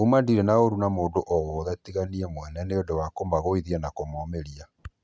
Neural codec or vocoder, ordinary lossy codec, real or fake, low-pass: none; none; real; none